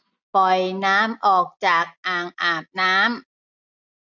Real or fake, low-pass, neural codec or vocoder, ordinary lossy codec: real; 7.2 kHz; none; none